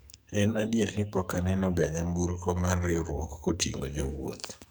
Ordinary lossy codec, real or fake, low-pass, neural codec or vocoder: none; fake; none; codec, 44.1 kHz, 2.6 kbps, SNAC